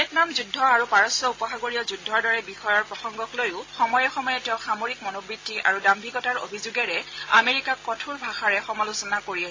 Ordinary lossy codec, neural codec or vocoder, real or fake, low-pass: AAC, 32 kbps; none; real; 7.2 kHz